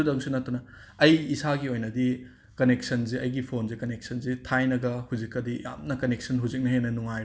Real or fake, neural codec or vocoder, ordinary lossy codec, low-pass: real; none; none; none